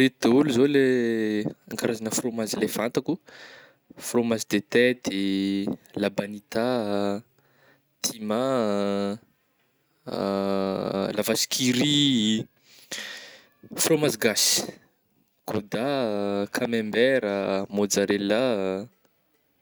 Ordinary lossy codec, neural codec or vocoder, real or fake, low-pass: none; none; real; none